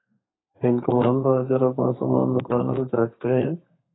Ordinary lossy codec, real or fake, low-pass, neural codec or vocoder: AAC, 16 kbps; fake; 7.2 kHz; codec, 32 kHz, 1.9 kbps, SNAC